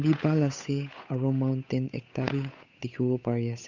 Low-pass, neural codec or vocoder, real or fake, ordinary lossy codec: 7.2 kHz; codec, 16 kHz, 8 kbps, FunCodec, trained on Chinese and English, 25 frames a second; fake; none